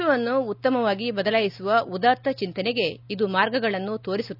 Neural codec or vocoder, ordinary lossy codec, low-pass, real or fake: none; none; 5.4 kHz; real